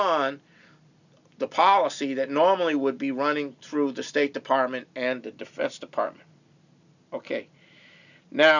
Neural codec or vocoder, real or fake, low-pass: none; real; 7.2 kHz